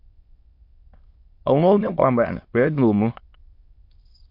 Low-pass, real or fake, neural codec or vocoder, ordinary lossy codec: 5.4 kHz; fake; autoencoder, 22.05 kHz, a latent of 192 numbers a frame, VITS, trained on many speakers; MP3, 32 kbps